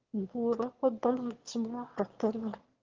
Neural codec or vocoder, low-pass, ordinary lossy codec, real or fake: autoencoder, 22.05 kHz, a latent of 192 numbers a frame, VITS, trained on one speaker; 7.2 kHz; Opus, 16 kbps; fake